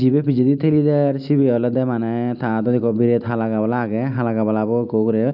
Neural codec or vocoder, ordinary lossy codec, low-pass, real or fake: none; none; 5.4 kHz; real